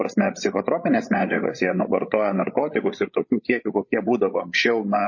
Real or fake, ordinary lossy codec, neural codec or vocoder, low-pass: fake; MP3, 32 kbps; codec, 16 kHz, 8 kbps, FreqCodec, larger model; 7.2 kHz